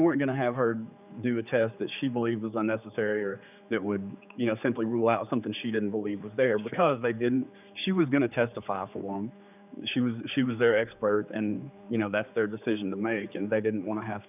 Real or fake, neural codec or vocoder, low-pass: fake; codec, 16 kHz, 4 kbps, X-Codec, HuBERT features, trained on general audio; 3.6 kHz